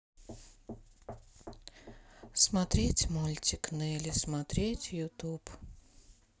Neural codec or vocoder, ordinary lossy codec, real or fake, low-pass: none; none; real; none